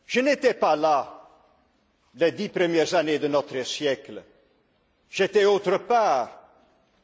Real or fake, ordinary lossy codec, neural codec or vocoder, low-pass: real; none; none; none